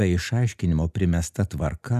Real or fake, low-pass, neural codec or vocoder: real; 14.4 kHz; none